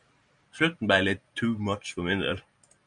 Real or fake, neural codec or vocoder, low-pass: real; none; 9.9 kHz